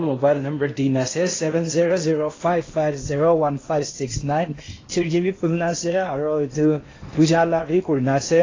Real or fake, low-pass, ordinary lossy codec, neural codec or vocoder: fake; 7.2 kHz; AAC, 32 kbps; codec, 16 kHz in and 24 kHz out, 0.8 kbps, FocalCodec, streaming, 65536 codes